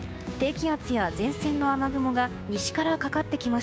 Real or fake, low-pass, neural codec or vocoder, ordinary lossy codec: fake; none; codec, 16 kHz, 6 kbps, DAC; none